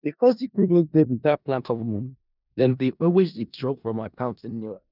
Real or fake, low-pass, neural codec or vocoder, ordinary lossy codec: fake; 5.4 kHz; codec, 16 kHz in and 24 kHz out, 0.4 kbps, LongCat-Audio-Codec, four codebook decoder; none